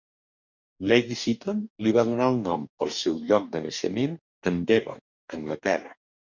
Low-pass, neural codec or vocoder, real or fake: 7.2 kHz; codec, 44.1 kHz, 2.6 kbps, DAC; fake